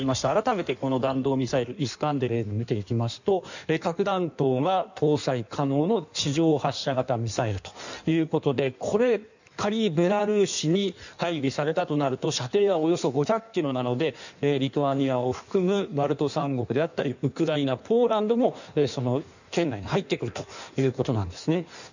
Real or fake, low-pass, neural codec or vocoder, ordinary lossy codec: fake; 7.2 kHz; codec, 16 kHz in and 24 kHz out, 1.1 kbps, FireRedTTS-2 codec; none